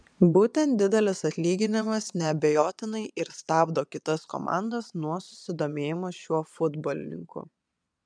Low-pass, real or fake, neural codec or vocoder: 9.9 kHz; fake; vocoder, 22.05 kHz, 80 mel bands, Vocos